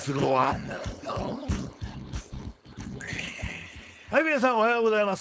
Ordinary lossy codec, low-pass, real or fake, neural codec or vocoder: none; none; fake; codec, 16 kHz, 4.8 kbps, FACodec